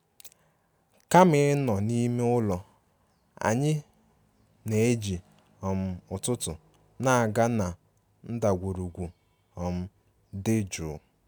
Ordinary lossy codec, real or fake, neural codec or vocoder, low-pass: none; real; none; none